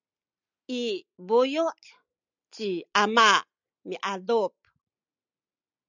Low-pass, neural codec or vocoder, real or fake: 7.2 kHz; none; real